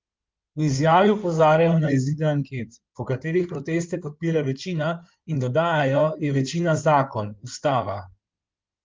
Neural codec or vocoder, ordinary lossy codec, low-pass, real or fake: codec, 16 kHz in and 24 kHz out, 2.2 kbps, FireRedTTS-2 codec; Opus, 32 kbps; 7.2 kHz; fake